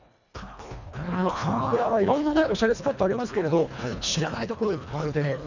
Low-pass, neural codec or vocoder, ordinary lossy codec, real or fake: 7.2 kHz; codec, 24 kHz, 1.5 kbps, HILCodec; none; fake